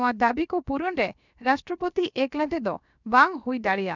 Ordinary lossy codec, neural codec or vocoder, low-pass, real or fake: none; codec, 16 kHz, about 1 kbps, DyCAST, with the encoder's durations; 7.2 kHz; fake